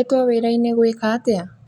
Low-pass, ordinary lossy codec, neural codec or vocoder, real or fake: 19.8 kHz; MP3, 96 kbps; none; real